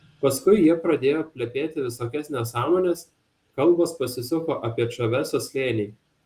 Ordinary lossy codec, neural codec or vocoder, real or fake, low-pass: Opus, 32 kbps; none; real; 14.4 kHz